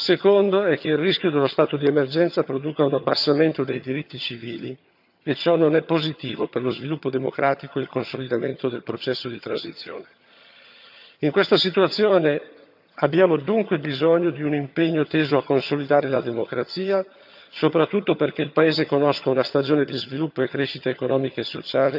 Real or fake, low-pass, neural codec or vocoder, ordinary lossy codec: fake; 5.4 kHz; vocoder, 22.05 kHz, 80 mel bands, HiFi-GAN; none